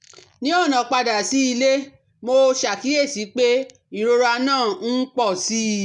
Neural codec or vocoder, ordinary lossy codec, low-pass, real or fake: none; none; 10.8 kHz; real